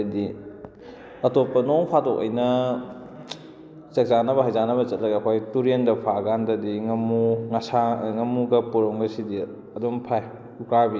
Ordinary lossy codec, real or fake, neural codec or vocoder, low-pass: none; real; none; none